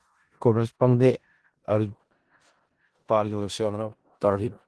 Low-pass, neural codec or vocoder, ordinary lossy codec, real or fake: 10.8 kHz; codec, 16 kHz in and 24 kHz out, 0.4 kbps, LongCat-Audio-Codec, four codebook decoder; Opus, 16 kbps; fake